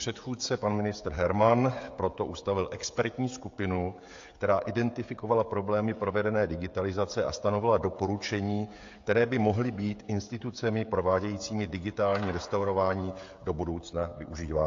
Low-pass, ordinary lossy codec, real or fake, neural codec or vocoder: 7.2 kHz; AAC, 48 kbps; fake; codec, 16 kHz, 16 kbps, FreqCodec, smaller model